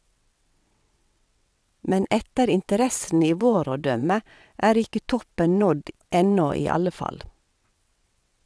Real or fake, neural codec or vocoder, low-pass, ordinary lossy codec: fake; vocoder, 22.05 kHz, 80 mel bands, WaveNeXt; none; none